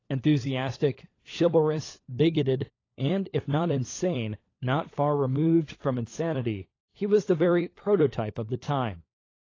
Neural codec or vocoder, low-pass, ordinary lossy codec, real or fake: codec, 16 kHz, 16 kbps, FunCodec, trained on LibriTTS, 50 frames a second; 7.2 kHz; AAC, 32 kbps; fake